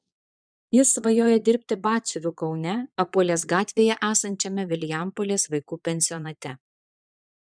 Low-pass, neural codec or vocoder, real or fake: 9.9 kHz; vocoder, 22.05 kHz, 80 mel bands, Vocos; fake